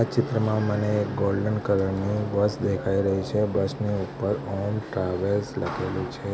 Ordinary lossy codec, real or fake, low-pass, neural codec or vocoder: none; real; none; none